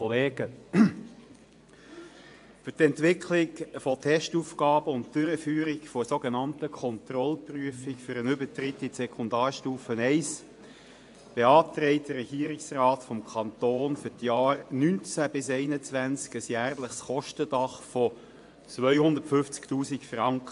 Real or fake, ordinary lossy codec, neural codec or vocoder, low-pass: fake; AAC, 64 kbps; vocoder, 24 kHz, 100 mel bands, Vocos; 10.8 kHz